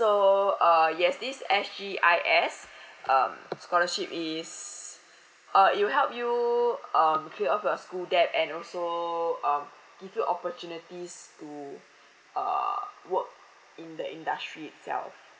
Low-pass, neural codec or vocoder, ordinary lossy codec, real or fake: none; none; none; real